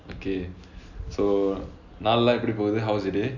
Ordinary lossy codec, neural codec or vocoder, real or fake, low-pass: none; none; real; 7.2 kHz